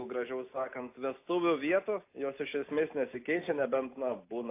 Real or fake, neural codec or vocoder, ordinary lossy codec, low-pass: real; none; AAC, 24 kbps; 3.6 kHz